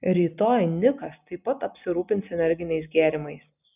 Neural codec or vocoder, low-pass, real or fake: none; 3.6 kHz; real